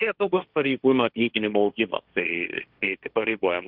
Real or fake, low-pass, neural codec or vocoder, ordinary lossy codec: fake; 5.4 kHz; codec, 16 kHz, 1.1 kbps, Voila-Tokenizer; Opus, 64 kbps